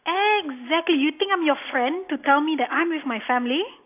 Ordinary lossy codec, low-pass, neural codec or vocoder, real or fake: AAC, 24 kbps; 3.6 kHz; none; real